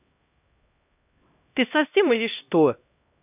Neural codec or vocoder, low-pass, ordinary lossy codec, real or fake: codec, 16 kHz, 1 kbps, X-Codec, HuBERT features, trained on LibriSpeech; 3.6 kHz; none; fake